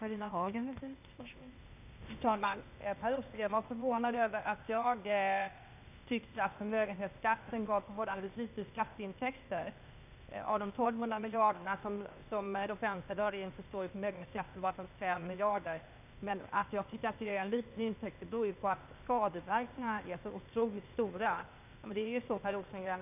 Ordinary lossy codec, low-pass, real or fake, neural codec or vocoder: none; 3.6 kHz; fake; codec, 16 kHz, 0.8 kbps, ZipCodec